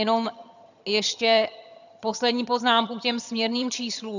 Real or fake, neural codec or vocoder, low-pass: fake; vocoder, 22.05 kHz, 80 mel bands, HiFi-GAN; 7.2 kHz